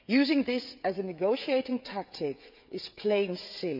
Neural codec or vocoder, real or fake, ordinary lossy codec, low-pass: codec, 16 kHz, 16 kbps, FreqCodec, smaller model; fake; none; 5.4 kHz